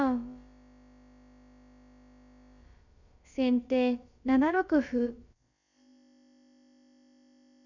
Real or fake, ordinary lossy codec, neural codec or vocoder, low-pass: fake; none; codec, 16 kHz, about 1 kbps, DyCAST, with the encoder's durations; 7.2 kHz